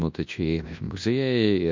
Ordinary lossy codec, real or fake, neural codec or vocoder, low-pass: MP3, 48 kbps; fake; codec, 24 kHz, 0.9 kbps, WavTokenizer, large speech release; 7.2 kHz